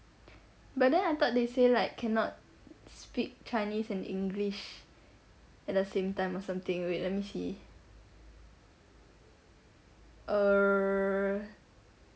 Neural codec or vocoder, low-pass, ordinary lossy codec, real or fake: none; none; none; real